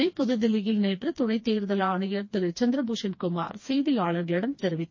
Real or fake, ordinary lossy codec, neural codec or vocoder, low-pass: fake; MP3, 32 kbps; codec, 16 kHz, 2 kbps, FreqCodec, smaller model; 7.2 kHz